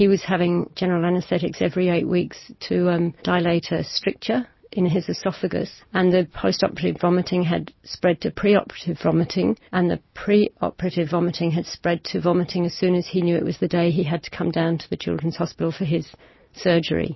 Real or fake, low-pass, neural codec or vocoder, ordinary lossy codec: real; 7.2 kHz; none; MP3, 24 kbps